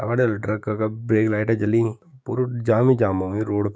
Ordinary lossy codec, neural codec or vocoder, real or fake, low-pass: none; codec, 16 kHz, 6 kbps, DAC; fake; none